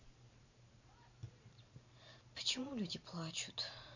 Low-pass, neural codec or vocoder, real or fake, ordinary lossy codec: 7.2 kHz; vocoder, 44.1 kHz, 128 mel bands every 512 samples, BigVGAN v2; fake; none